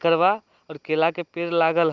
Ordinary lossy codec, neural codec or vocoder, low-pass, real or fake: Opus, 24 kbps; none; 7.2 kHz; real